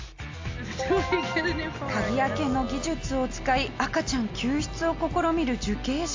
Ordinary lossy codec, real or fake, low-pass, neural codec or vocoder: AAC, 48 kbps; real; 7.2 kHz; none